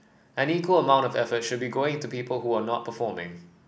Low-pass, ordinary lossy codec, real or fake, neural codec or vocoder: none; none; real; none